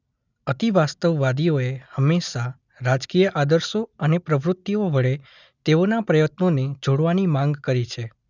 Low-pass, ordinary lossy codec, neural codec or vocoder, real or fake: 7.2 kHz; none; none; real